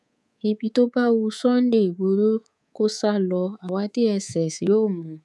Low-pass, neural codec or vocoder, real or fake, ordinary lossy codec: none; codec, 24 kHz, 3.1 kbps, DualCodec; fake; none